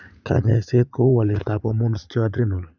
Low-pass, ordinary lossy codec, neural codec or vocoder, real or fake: 7.2 kHz; none; vocoder, 44.1 kHz, 80 mel bands, Vocos; fake